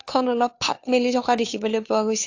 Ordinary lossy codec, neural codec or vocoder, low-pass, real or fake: AAC, 48 kbps; codec, 16 kHz, 2 kbps, FunCodec, trained on Chinese and English, 25 frames a second; 7.2 kHz; fake